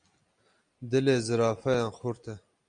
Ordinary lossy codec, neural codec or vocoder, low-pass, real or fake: Opus, 64 kbps; none; 9.9 kHz; real